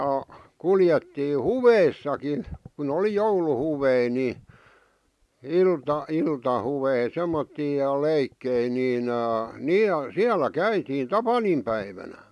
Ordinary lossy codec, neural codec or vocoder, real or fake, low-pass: none; none; real; none